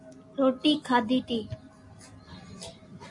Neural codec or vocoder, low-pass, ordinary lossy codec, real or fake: none; 10.8 kHz; MP3, 48 kbps; real